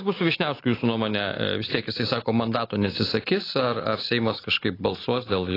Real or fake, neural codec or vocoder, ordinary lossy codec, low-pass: real; none; AAC, 24 kbps; 5.4 kHz